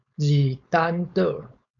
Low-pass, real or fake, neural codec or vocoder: 7.2 kHz; fake; codec, 16 kHz, 4.8 kbps, FACodec